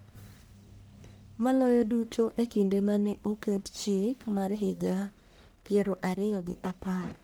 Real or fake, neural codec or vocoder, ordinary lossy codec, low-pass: fake; codec, 44.1 kHz, 1.7 kbps, Pupu-Codec; none; none